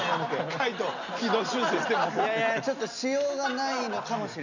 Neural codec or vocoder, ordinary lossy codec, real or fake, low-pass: none; none; real; 7.2 kHz